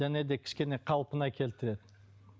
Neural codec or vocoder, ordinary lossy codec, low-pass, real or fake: none; none; none; real